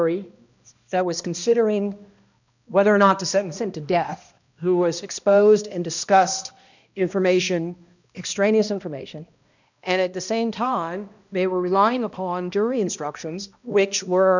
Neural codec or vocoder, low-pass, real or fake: codec, 16 kHz, 1 kbps, X-Codec, HuBERT features, trained on balanced general audio; 7.2 kHz; fake